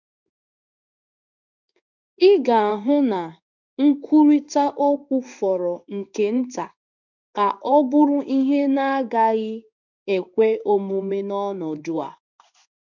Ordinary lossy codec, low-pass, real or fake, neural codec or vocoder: none; 7.2 kHz; fake; codec, 16 kHz in and 24 kHz out, 1 kbps, XY-Tokenizer